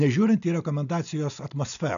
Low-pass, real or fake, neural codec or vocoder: 7.2 kHz; real; none